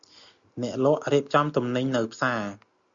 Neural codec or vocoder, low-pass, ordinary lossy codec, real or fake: none; 7.2 kHz; Opus, 64 kbps; real